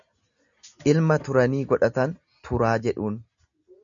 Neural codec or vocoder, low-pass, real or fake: none; 7.2 kHz; real